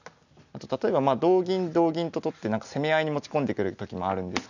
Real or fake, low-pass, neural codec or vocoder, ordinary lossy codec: real; 7.2 kHz; none; none